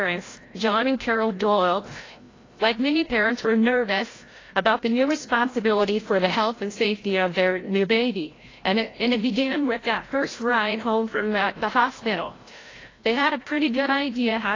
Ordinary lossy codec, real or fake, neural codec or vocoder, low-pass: AAC, 32 kbps; fake; codec, 16 kHz, 0.5 kbps, FreqCodec, larger model; 7.2 kHz